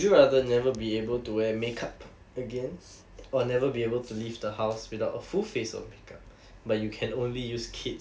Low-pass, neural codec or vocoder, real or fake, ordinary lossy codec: none; none; real; none